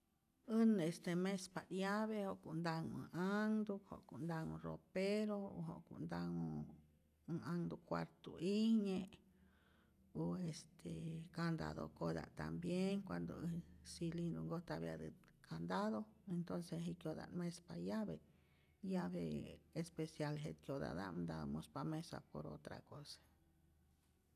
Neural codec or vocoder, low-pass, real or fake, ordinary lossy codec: none; 14.4 kHz; real; none